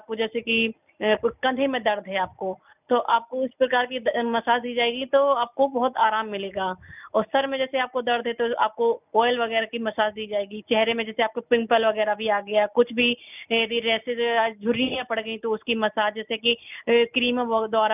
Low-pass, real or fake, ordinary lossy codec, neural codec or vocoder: 3.6 kHz; real; none; none